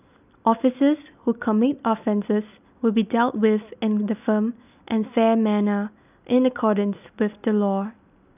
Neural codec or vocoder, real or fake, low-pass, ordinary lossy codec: none; real; 3.6 kHz; none